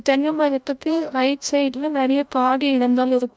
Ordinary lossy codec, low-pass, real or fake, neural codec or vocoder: none; none; fake; codec, 16 kHz, 0.5 kbps, FreqCodec, larger model